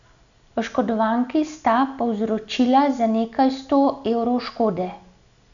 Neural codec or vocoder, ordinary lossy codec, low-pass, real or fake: none; none; 7.2 kHz; real